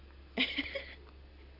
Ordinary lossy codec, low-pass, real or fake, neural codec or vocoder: none; 5.4 kHz; real; none